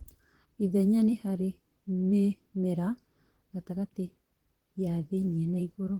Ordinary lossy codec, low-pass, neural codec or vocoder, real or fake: Opus, 16 kbps; 19.8 kHz; vocoder, 44.1 kHz, 128 mel bands, Pupu-Vocoder; fake